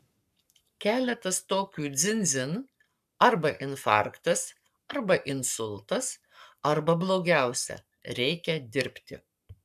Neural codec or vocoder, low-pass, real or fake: codec, 44.1 kHz, 7.8 kbps, Pupu-Codec; 14.4 kHz; fake